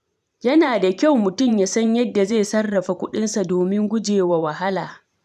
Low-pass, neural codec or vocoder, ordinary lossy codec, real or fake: 14.4 kHz; vocoder, 44.1 kHz, 128 mel bands every 512 samples, BigVGAN v2; none; fake